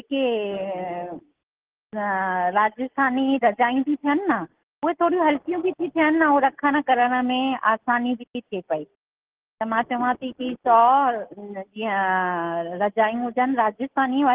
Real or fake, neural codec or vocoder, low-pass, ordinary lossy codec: real; none; 3.6 kHz; Opus, 16 kbps